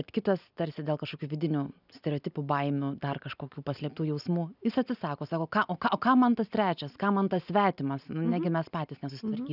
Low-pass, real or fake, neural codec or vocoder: 5.4 kHz; real; none